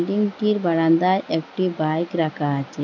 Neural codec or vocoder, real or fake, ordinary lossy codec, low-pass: none; real; none; 7.2 kHz